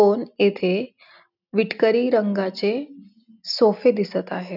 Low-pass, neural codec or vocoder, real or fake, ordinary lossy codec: 5.4 kHz; none; real; none